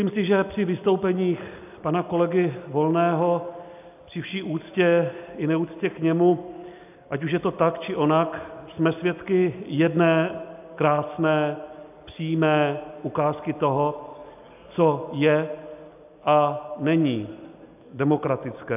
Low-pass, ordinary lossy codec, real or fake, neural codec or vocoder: 3.6 kHz; AAC, 32 kbps; real; none